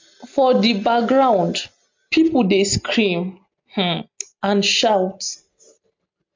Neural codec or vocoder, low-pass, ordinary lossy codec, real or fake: none; 7.2 kHz; MP3, 64 kbps; real